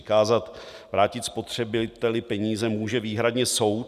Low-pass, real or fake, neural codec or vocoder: 14.4 kHz; real; none